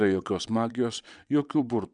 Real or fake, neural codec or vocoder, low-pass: fake; vocoder, 22.05 kHz, 80 mel bands, WaveNeXt; 9.9 kHz